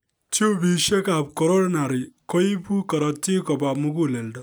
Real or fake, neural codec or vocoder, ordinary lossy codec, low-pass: real; none; none; none